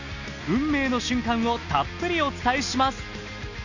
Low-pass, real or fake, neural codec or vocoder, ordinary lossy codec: 7.2 kHz; real; none; none